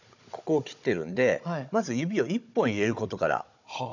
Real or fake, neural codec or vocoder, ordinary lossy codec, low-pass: fake; codec, 16 kHz, 16 kbps, FunCodec, trained on Chinese and English, 50 frames a second; none; 7.2 kHz